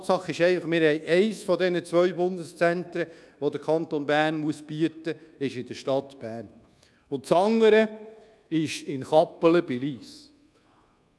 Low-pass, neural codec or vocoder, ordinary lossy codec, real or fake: none; codec, 24 kHz, 1.2 kbps, DualCodec; none; fake